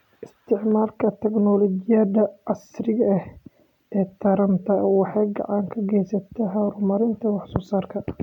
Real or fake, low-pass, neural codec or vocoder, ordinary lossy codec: real; 19.8 kHz; none; none